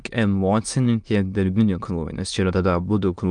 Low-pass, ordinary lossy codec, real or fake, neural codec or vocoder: 9.9 kHz; Opus, 32 kbps; fake; autoencoder, 22.05 kHz, a latent of 192 numbers a frame, VITS, trained on many speakers